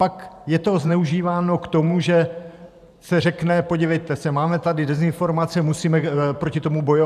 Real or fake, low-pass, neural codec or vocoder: fake; 14.4 kHz; vocoder, 44.1 kHz, 128 mel bands every 512 samples, BigVGAN v2